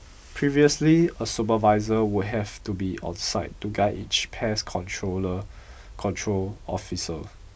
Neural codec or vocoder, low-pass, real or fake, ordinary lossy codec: none; none; real; none